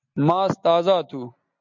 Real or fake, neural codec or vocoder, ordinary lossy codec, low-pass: real; none; MP3, 64 kbps; 7.2 kHz